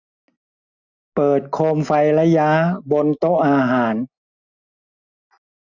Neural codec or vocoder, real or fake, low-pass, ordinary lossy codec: none; real; 7.2 kHz; none